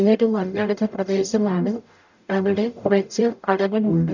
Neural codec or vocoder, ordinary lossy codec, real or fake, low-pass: codec, 44.1 kHz, 0.9 kbps, DAC; none; fake; 7.2 kHz